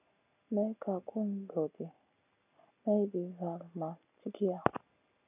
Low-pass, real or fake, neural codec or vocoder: 3.6 kHz; real; none